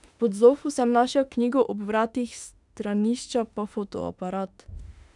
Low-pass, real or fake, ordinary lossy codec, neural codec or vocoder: 10.8 kHz; fake; none; autoencoder, 48 kHz, 32 numbers a frame, DAC-VAE, trained on Japanese speech